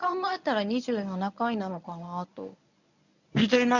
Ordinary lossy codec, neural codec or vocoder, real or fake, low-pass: none; codec, 24 kHz, 0.9 kbps, WavTokenizer, medium speech release version 1; fake; 7.2 kHz